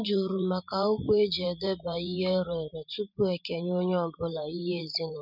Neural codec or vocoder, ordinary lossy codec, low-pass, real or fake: vocoder, 44.1 kHz, 128 mel bands every 512 samples, BigVGAN v2; none; 5.4 kHz; fake